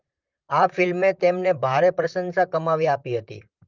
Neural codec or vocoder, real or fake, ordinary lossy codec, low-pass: vocoder, 44.1 kHz, 128 mel bands, Pupu-Vocoder; fake; Opus, 32 kbps; 7.2 kHz